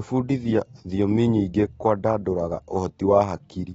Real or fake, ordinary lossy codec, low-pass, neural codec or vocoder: real; AAC, 24 kbps; 14.4 kHz; none